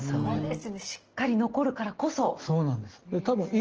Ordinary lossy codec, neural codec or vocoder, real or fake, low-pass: Opus, 16 kbps; none; real; 7.2 kHz